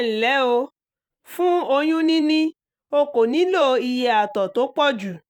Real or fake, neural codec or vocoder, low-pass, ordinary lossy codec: fake; vocoder, 44.1 kHz, 128 mel bands every 512 samples, BigVGAN v2; 19.8 kHz; none